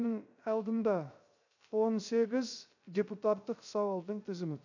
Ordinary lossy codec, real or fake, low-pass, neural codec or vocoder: none; fake; 7.2 kHz; codec, 16 kHz, 0.3 kbps, FocalCodec